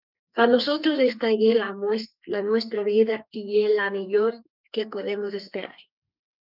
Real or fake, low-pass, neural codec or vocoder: fake; 5.4 kHz; codec, 32 kHz, 1.9 kbps, SNAC